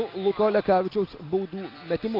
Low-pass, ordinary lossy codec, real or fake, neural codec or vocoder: 5.4 kHz; Opus, 24 kbps; real; none